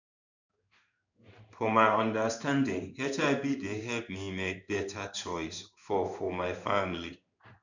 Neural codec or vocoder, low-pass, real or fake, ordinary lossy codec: codec, 16 kHz in and 24 kHz out, 1 kbps, XY-Tokenizer; 7.2 kHz; fake; none